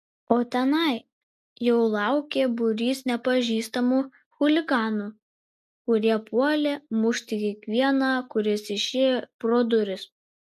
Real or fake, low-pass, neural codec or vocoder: real; 14.4 kHz; none